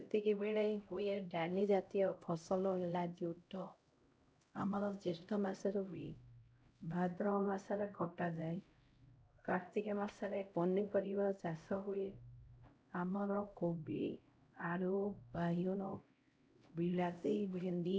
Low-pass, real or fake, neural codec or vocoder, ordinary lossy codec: none; fake; codec, 16 kHz, 0.5 kbps, X-Codec, HuBERT features, trained on LibriSpeech; none